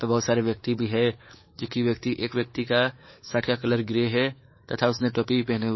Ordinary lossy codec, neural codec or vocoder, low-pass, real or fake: MP3, 24 kbps; codec, 24 kHz, 3.1 kbps, DualCodec; 7.2 kHz; fake